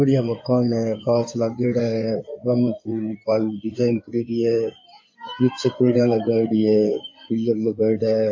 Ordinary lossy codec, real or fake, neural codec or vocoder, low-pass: AAC, 48 kbps; fake; codec, 16 kHz in and 24 kHz out, 2.2 kbps, FireRedTTS-2 codec; 7.2 kHz